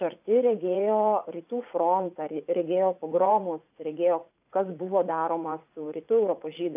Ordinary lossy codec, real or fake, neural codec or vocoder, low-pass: AAC, 32 kbps; fake; vocoder, 44.1 kHz, 80 mel bands, Vocos; 3.6 kHz